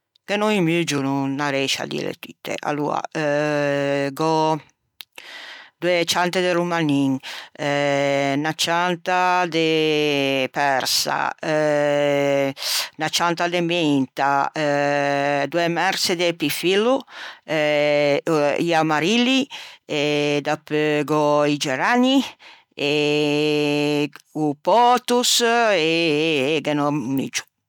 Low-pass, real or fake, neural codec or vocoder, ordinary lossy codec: 19.8 kHz; real; none; none